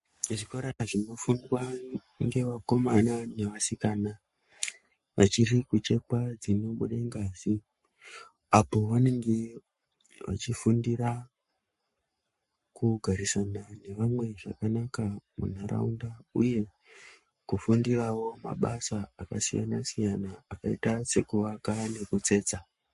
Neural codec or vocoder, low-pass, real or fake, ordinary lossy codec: codec, 44.1 kHz, 7.8 kbps, Pupu-Codec; 14.4 kHz; fake; MP3, 48 kbps